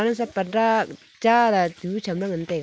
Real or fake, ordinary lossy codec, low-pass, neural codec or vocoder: real; none; none; none